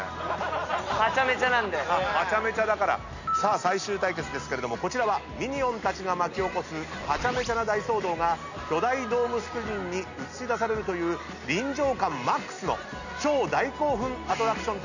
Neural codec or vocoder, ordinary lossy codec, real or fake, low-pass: none; none; real; 7.2 kHz